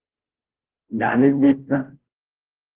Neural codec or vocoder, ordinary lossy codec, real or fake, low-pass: codec, 16 kHz, 0.5 kbps, FunCodec, trained on Chinese and English, 25 frames a second; Opus, 16 kbps; fake; 3.6 kHz